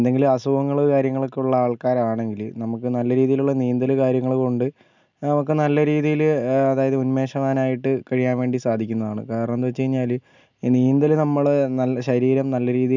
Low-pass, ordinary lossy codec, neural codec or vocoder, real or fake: 7.2 kHz; none; none; real